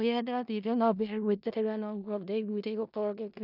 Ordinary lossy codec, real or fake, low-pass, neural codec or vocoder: none; fake; 5.4 kHz; codec, 16 kHz in and 24 kHz out, 0.4 kbps, LongCat-Audio-Codec, four codebook decoder